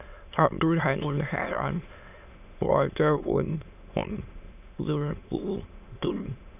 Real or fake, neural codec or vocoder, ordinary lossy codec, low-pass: fake; autoencoder, 22.05 kHz, a latent of 192 numbers a frame, VITS, trained on many speakers; none; 3.6 kHz